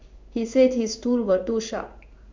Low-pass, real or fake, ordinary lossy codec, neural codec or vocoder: 7.2 kHz; fake; MP3, 64 kbps; codec, 16 kHz in and 24 kHz out, 1 kbps, XY-Tokenizer